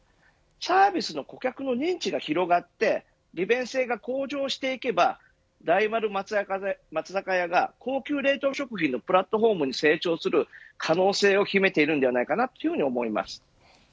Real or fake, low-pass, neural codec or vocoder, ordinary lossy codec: real; none; none; none